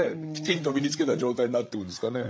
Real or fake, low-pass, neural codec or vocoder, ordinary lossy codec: fake; none; codec, 16 kHz, 16 kbps, FreqCodec, larger model; none